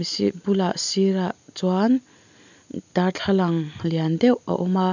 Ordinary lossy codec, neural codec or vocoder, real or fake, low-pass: none; none; real; 7.2 kHz